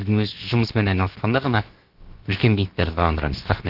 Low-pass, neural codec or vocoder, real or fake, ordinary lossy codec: 5.4 kHz; codec, 16 kHz, about 1 kbps, DyCAST, with the encoder's durations; fake; Opus, 16 kbps